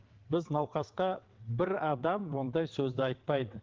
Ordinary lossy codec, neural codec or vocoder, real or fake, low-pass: Opus, 16 kbps; vocoder, 22.05 kHz, 80 mel bands, WaveNeXt; fake; 7.2 kHz